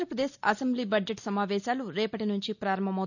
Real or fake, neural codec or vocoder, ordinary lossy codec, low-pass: real; none; none; 7.2 kHz